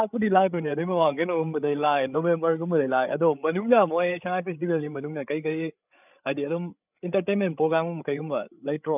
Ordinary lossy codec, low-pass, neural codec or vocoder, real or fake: none; 3.6 kHz; codec, 16 kHz, 8 kbps, FreqCodec, larger model; fake